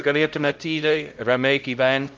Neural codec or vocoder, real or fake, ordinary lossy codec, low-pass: codec, 16 kHz, 0.5 kbps, X-Codec, HuBERT features, trained on LibriSpeech; fake; Opus, 24 kbps; 7.2 kHz